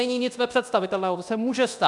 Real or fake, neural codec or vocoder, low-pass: fake; codec, 24 kHz, 0.9 kbps, DualCodec; 10.8 kHz